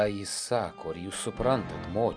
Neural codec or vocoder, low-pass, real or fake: none; 9.9 kHz; real